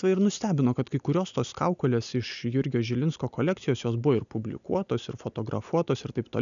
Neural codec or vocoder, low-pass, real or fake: none; 7.2 kHz; real